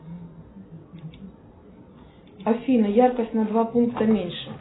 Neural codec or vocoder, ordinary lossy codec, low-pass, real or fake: none; AAC, 16 kbps; 7.2 kHz; real